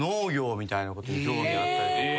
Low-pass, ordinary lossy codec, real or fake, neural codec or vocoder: none; none; real; none